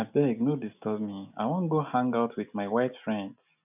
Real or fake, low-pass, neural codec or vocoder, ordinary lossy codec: real; 3.6 kHz; none; none